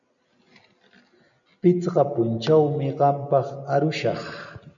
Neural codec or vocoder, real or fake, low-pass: none; real; 7.2 kHz